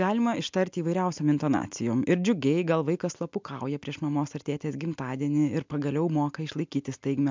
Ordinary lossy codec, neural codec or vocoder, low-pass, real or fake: MP3, 64 kbps; none; 7.2 kHz; real